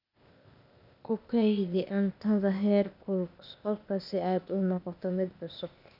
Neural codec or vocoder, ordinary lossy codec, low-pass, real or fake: codec, 16 kHz, 0.8 kbps, ZipCodec; none; 5.4 kHz; fake